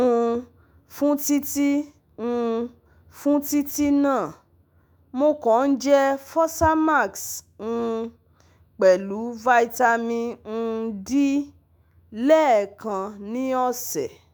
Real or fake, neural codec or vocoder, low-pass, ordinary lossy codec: fake; autoencoder, 48 kHz, 128 numbers a frame, DAC-VAE, trained on Japanese speech; none; none